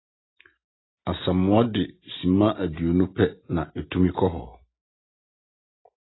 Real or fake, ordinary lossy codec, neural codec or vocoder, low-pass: real; AAC, 16 kbps; none; 7.2 kHz